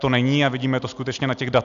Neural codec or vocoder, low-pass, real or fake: none; 7.2 kHz; real